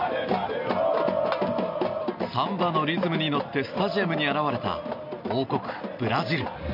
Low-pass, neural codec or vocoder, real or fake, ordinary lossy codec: 5.4 kHz; none; real; none